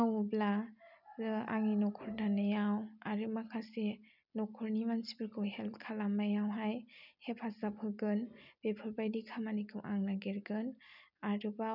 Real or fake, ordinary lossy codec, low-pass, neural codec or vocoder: real; none; 5.4 kHz; none